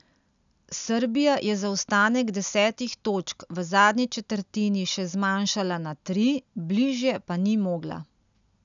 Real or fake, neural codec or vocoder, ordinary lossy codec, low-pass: real; none; none; 7.2 kHz